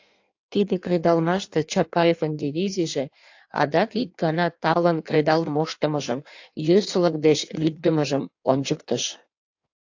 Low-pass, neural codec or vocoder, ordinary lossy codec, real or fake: 7.2 kHz; codec, 16 kHz in and 24 kHz out, 1.1 kbps, FireRedTTS-2 codec; AAC, 48 kbps; fake